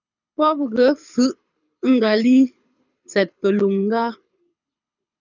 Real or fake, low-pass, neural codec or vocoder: fake; 7.2 kHz; codec, 24 kHz, 6 kbps, HILCodec